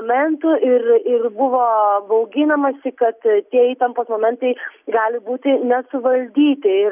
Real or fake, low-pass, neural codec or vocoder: real; 3.6 kHz; none